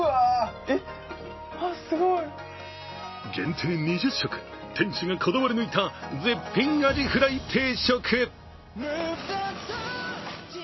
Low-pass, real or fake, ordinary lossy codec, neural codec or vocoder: 7.2 kHz; real; MP3, 24 kbps; none